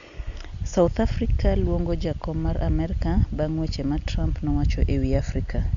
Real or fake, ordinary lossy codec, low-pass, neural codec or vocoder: real; Opus, 64 kbps; 7.2 kHz; none